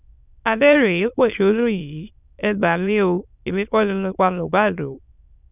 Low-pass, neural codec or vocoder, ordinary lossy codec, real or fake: 3.6 kHz; autoencoder, 22.05 kHz, a latent of 192 numbers a frame, VITS, trained on many speakers; none; fake